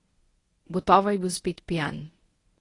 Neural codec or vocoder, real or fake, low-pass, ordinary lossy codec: codec, 24 kHz, 0.9 kbps, WavTokenizer, medium speech release version 1; fake; 10.8 kHz; AAC, 32 kbps